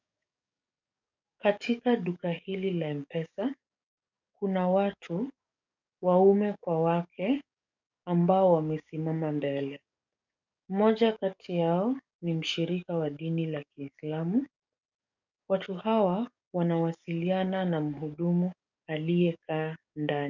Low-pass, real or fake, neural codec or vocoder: 7.2 kHz; fake; codec, 44.1 kHz, 7.8 kbps, DAC